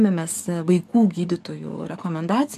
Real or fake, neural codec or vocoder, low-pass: fake; codec, 44.1 kHz, 7.8 kbps, DAC; 14.4 kHz